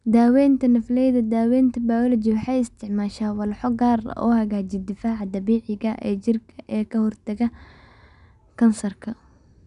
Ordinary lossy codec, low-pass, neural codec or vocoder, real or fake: none; 10.8 kHz; none; real